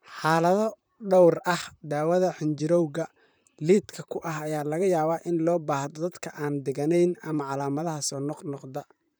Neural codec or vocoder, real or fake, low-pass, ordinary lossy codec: vocoder, 44.1 kHz, 128 mel bands, Pupu-Vocoder; fake; none; none